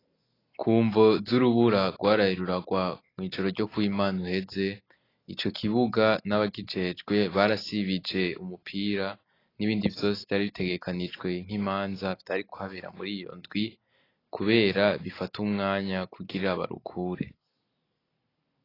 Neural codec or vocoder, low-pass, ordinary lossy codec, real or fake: none; 5.4 kHz; AAC, 24 kbps; real